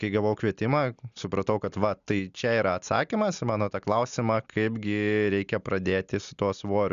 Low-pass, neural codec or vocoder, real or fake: 7.2 kHz; none; real